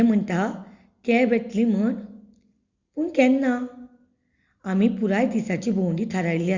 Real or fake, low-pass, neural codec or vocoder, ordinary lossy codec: real; 7.2 kHz; none; Opus, 64 kbps